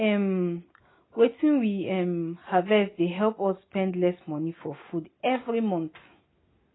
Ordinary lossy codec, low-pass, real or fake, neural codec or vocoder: AAC, 16 kbps; 7.2 kHz; real; none